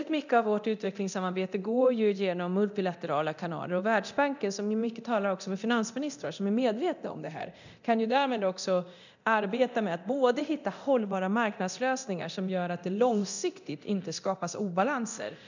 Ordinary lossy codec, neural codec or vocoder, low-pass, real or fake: none; codec, 24 kHz, 0.9 kbps, DualCodec; 7.2 kHz; fake